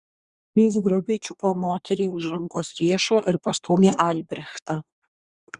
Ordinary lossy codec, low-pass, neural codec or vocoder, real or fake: Opus, 64 kbps; 10.8 kHz; codec, 24 kHz, 1 kbps, SNAC; fake